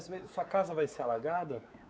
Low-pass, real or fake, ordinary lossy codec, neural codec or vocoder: none; fake; none; codec, 16 kHz, 4 kbps, X-Codec, WavLM features, trained on Multilingual LibriSpeech